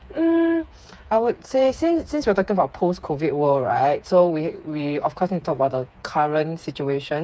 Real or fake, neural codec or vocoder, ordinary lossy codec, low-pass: fake; codec, 16 kHz, 4 kbps, FreqCodec, smaller model; none; none